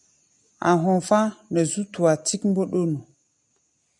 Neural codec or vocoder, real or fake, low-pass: none; real; 10.8 kHz